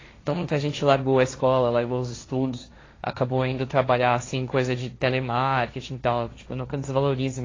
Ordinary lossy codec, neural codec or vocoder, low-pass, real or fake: AAC, 32 kbps; codec, 16 kHz, 1.1 kbps, Voila-Tokenizer; 7.2 kHz; fake